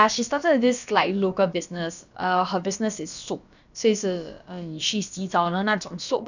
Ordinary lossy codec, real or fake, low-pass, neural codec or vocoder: none; fake; 7.2 kHz; codec, 16 kHz, about 1 kbps, DyCAST, with the encoder's durations